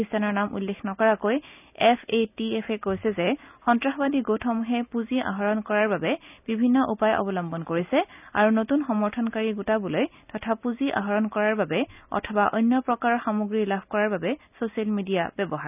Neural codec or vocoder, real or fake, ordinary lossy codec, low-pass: none; real; none; 3.6 kHz